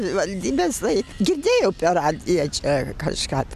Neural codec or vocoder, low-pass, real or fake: none; 14.4 kHz; real